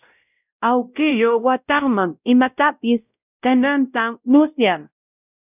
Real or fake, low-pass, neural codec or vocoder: fake; 3.6 kHz; codec, 16 kHz, 0.5 kbps, X-Codec, WavLM features, trained on Multilingual LibriSpeech